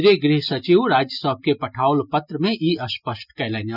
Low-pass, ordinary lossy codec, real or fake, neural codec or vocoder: 5.4 kHz; none; real; none